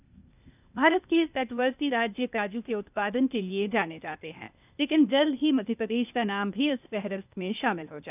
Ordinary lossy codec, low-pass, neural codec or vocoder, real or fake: none; 3.6 kHz; codec, 16 kHz, 0.8 kbps, ZipCodec; fake